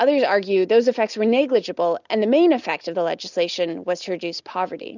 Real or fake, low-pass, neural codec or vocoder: real; 7.2 kHz; none